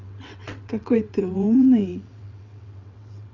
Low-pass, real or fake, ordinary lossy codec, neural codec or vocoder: 7.2 kHz; fake; none; vocoder, 22.05 kHz, 80 mel bands, WaveNeXt